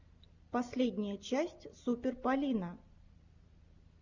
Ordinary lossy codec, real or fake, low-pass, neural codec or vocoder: Opus, 64 kbps; real; 7.2 kHz; none